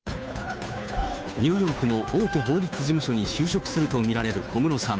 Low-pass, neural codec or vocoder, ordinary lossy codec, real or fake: none; codec, 16 kHz, 2 kbps, FunCodec, trained on Chinese and English, 25 frames a second; none; fake